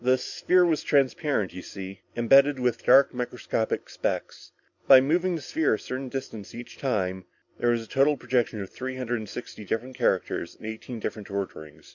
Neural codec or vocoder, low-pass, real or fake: none; 7.2 kHz; real